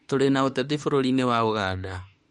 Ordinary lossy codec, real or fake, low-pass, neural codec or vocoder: MP3, 48 kbps; fake; 19.8 kHz; autoencoder, 48 kHz, 32 numbers a frame, DAC-VAE, trained on Japanese speech